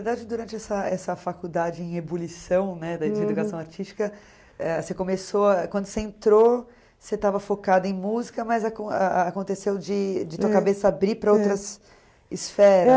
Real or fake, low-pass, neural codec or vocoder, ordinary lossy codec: real; none; none; none